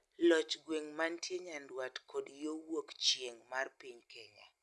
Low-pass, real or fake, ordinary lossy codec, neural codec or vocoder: none; real; none; none